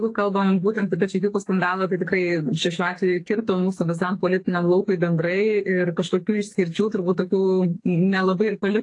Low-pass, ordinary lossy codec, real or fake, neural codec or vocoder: 10.8 kHz; AAC, 48 kbps; fake; codec, 44.1 kHz, 2.6 kbps, SNAC